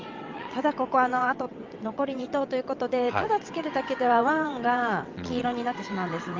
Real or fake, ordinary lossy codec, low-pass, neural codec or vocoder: fake; Opus, 24 kbps; 7.2 kHz; vocoder, 22.05 kHz, 80 mel bands, WaveNeXt